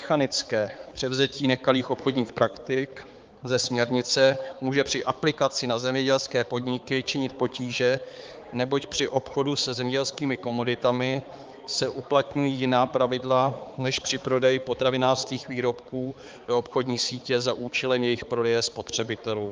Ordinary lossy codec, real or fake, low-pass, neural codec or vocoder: Opus, 32 kbps; fake; 7.2 kHz; codec, 16 kHz, 4 kbps, X-Codec, HuBERT features, trained on balanced general audio